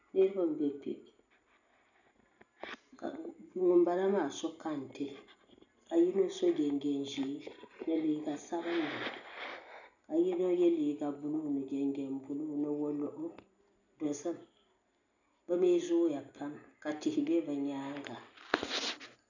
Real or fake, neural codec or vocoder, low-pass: real; none; 7.2 kHz